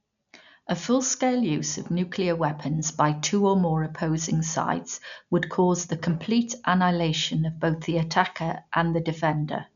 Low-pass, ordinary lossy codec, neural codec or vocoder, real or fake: 7.2 kHz; none; none; real